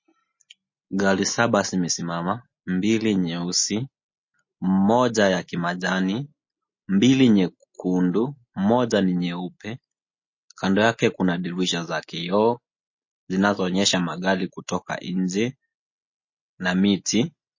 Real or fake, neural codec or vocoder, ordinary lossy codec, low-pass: real; none; MP3, 32 kbps; 7.2 kHz